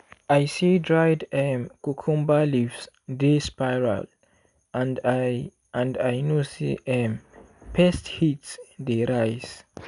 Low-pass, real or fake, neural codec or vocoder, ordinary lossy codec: 10.8 kHz; real; none; none